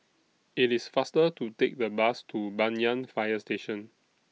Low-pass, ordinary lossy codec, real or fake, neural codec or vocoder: none; none; real; none